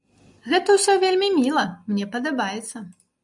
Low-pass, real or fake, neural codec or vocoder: 10.8 kHz; real; none